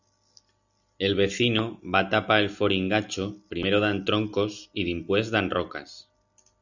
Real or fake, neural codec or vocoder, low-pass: real; none; 7.2 kHz